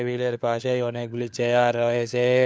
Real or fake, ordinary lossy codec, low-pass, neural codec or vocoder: fake; none; none; codec, 16 kHz, 4 kbps, FunCodec, trained on LibriTTS, 50 frames a second